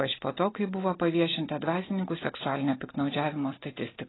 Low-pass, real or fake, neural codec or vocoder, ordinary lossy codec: 7.2 kHz; real; none; AAC, 16 kbps